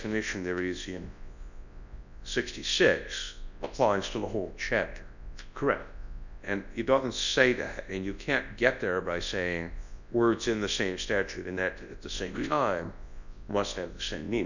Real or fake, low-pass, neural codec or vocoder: fake; 7.2 kHz; codec, 24 kHz, 0.9 kbps, WavTokenizer, large speech release